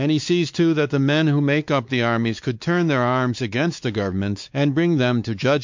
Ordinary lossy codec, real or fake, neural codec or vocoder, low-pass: MP3, 64 kbps; fake; codec, 16 kHz, 4 kbps, X-Codec, WavLM features, trained on Multilingual LibriSpeech; 7.2 kHz